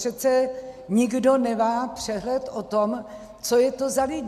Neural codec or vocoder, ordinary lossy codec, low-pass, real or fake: none; MP3, 96 kbps; 14.4 kHz; real